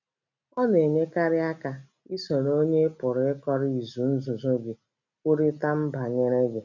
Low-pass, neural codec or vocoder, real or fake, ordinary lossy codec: 7.2 kHz; none; real; none